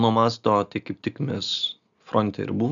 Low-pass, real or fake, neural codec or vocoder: 7.2 kHz; real; none